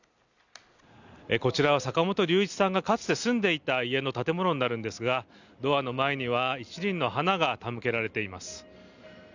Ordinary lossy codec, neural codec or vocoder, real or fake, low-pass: none; none; real; 7.2 kHz